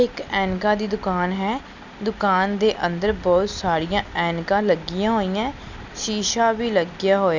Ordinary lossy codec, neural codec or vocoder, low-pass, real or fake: none; none; 7.2 kHz; real